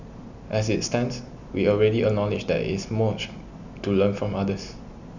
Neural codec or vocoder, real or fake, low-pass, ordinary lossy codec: none; real; 7.2 kHz; none